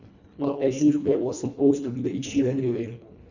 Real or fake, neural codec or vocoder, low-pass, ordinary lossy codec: fake; codec, 24 kHz, 1.5 kbps, HILCodec; 7.2 kHz; none